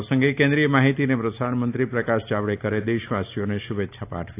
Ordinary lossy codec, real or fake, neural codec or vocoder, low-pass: AAC, 24 kbps; real; none; 3.6 kHz